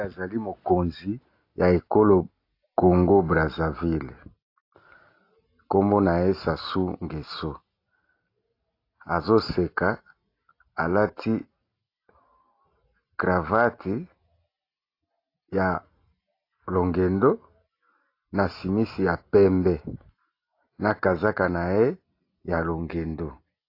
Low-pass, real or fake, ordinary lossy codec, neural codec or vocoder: 5.4 kHz; real; AAC, 32 kbps; none